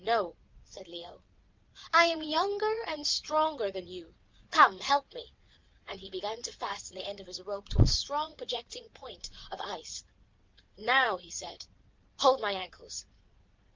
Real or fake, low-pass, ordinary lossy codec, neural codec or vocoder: fake; 7.2 kHz; Opus, 24 kbps; vocoder, 22.05 kHz, 80 mel bands, Vocos